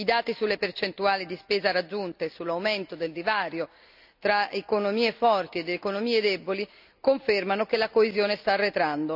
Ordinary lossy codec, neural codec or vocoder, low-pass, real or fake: none; none; 5.4 kHz; real